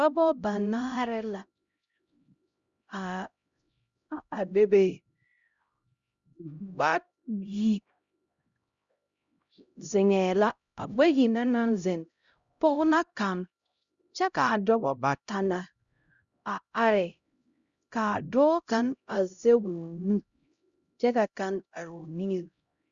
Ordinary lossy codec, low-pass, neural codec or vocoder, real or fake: Opus, 64 kbps; 7.2 kHz; codec, 16 kHz, 0.5 kbps, X-Codec, HuBERT features, trained on LibriSpeech; fake